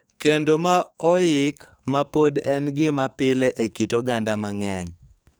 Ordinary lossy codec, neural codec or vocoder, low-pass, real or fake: none; codec, 44.1 kHz, 2.6 kbps, SNAC; none; fake